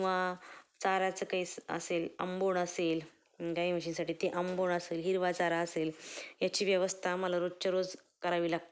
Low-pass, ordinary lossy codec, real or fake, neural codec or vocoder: none; none; real; none